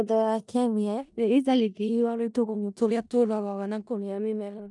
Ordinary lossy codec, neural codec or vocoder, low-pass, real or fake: none; codec, 16 kHz in and 24 kHz out, 0.4 kbps, LongCat-Audio-Codec, four codebook decoder; 10.8 kHz; fake